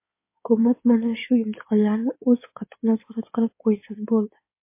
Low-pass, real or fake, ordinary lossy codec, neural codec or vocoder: 3.6 kHz; fake; MP3, 24 kbps; codec, 16 kHz in and 24 kHz out, 2.2 kbps, FireRedTTS-2 codec